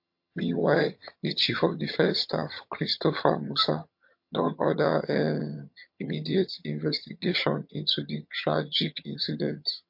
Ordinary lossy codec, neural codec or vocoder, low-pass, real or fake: MP3, 32 kbps; vocoder, 22.05 kHz, 80 mel bands, HiFi-GAN; 5.4 kHz; fake